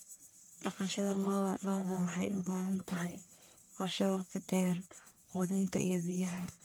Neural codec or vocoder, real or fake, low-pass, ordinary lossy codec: codec, 44.1 kHz, 1.7 kbps, Pupu-Codec; fake; none; none